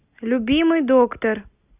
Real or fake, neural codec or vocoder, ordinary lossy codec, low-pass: real; none; Opus, 64 kbps; 3.6 kHz